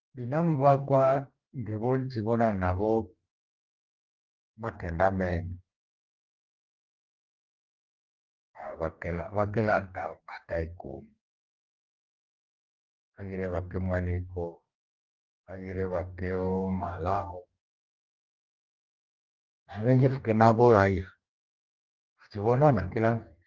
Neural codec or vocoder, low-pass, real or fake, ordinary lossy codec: codec, 44.1 kHz, 2.6 kbps, DAC; 7.2 kHz; fake; Opus, 24 kbps